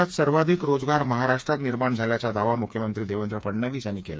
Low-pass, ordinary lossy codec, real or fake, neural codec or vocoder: none; none; fake; codec, 16 kHz, 4 kbps, FreqCodec, smaller model